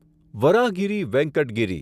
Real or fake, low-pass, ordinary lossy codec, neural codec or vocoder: real; 14.4 kHz; none; none